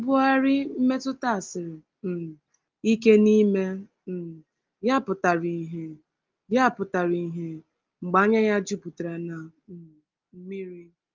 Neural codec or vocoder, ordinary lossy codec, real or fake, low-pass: none; Opus, 32 kbps; real; 7.2 kHz